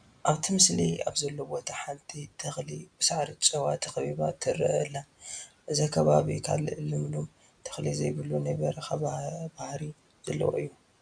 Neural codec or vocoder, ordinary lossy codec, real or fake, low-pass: none; MP3, 96 kbps; real; 9.9 kHz